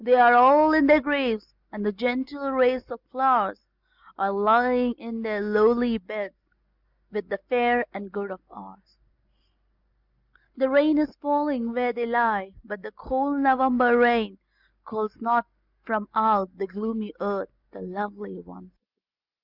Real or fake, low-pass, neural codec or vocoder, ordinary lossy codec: real; 5.4 kHz; none; Opus, 64 kbps